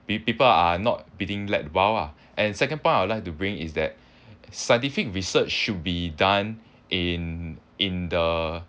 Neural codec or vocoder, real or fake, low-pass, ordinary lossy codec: none; real; none; none